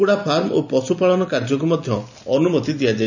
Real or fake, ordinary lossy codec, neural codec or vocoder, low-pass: real; none; none; 7.2 kHz